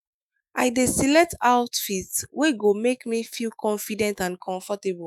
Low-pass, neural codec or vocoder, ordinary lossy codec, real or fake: none; autoencoder, 48 kHz, 128 numbers a frame, DAC-VAE, trained on Japanese speech; none; fake